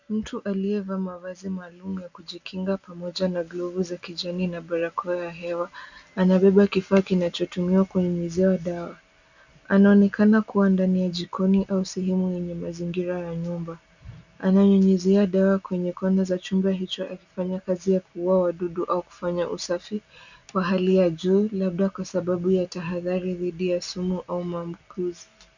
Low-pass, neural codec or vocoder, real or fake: 7.2 kHz; none; real